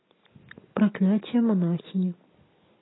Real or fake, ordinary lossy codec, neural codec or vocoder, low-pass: real; AAC, 16 kbps; none; 7.2 kHz